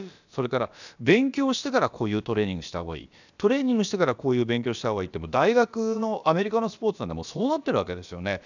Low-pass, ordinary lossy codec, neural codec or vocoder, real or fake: 7.2 kHz; none; codec, 16 kHz, about 1 kbps, DyCAST, with the encoder's durations; fake